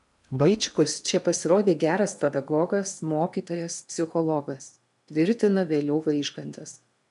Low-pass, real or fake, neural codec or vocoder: 10.8 kHz; fake; codec, 16 kHz in and 24 kHz out, 0.8 kbps, FocalCodec, streaming, 65536 codes